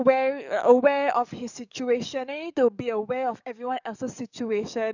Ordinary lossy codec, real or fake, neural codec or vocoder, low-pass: none; fake; codec, 44.1 kHz, 7.8 kbps, DAC; 7.2 kHz